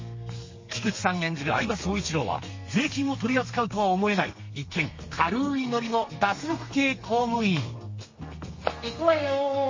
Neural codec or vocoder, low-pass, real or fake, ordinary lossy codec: codec, 32 kHz, 1.9 kbps, SNAC; 7.2 kHz; fake; MP3, 32 kbps